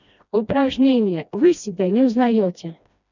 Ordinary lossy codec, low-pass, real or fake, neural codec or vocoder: none; 7.2 kHz; fake; codec, 16 kHz, 1 kbps, FreqCodec, smaller model